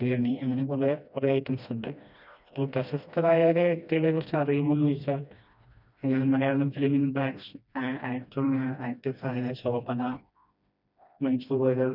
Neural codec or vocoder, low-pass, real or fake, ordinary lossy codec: codec, 16 kHz, 1 kbps, FreqCodec, smaller model; 5.4 kHz; fake; none